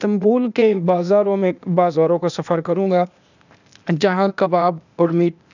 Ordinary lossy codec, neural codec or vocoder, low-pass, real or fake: none; codec, 16 kHz, 0.8 kbps, ZipCodec; 7.2 kHz; fake